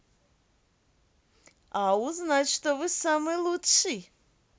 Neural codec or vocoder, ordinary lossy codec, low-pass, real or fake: none; none; none; real